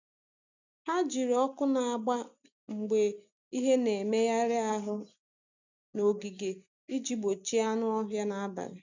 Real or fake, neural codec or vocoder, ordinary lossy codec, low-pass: real; none; none; 7.2 kHz